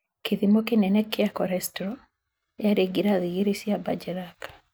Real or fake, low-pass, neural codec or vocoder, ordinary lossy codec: real; none; none; none